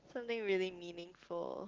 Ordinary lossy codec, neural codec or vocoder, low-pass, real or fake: Opus, 16 kbps; none; 7.2 kHz; real